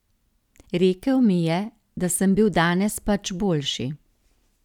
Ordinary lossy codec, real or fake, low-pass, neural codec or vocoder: none; real; 19.8 kHz; none